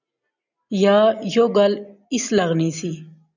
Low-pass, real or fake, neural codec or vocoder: 7.2 kHz; real; none